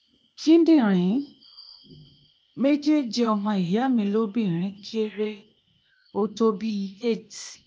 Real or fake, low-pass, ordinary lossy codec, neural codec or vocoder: fake; none; none; codec, 16 kHz, 0.8 kbps, ZipCodec